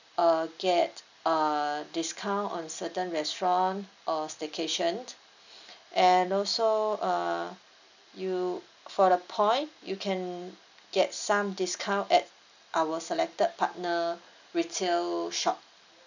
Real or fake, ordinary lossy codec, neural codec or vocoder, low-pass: real; none; none; 7.2 kHz